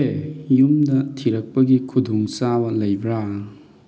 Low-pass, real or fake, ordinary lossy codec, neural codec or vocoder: none; real; none; none